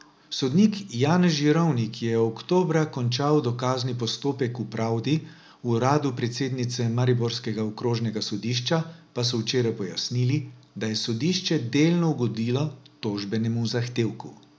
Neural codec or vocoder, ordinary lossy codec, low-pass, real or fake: none; none; none; real